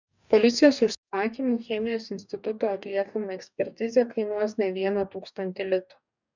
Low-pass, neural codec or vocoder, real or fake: 7.2 kHz; codec, 44.1 kHz, 2.6 kbps, DAC; fake